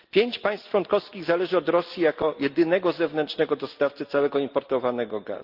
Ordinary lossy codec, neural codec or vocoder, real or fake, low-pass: Opus, 32 kbps; none; real; 5.4 kHz